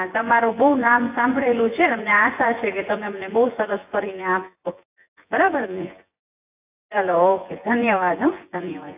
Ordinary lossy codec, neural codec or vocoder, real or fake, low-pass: AAC, 32 kbps; vocoder, 24 kHz, 100 mel bands, Vocos; fake; 3.6 kHz